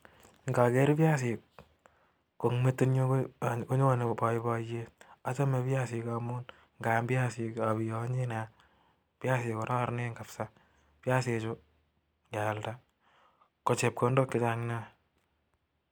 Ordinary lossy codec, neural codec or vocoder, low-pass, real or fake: none; none; none; real